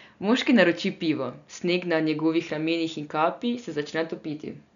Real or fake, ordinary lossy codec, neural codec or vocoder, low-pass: real; none; none; 7.2 kHz